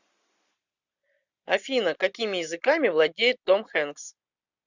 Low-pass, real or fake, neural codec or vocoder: 7.2 kHz; real; none